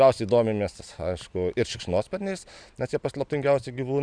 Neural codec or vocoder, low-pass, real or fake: none; 9.9 kHz; real